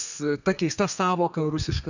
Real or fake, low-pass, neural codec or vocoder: fake; 7.2 kHz; codec, 32 kHz, 1.9 kbps, SNAC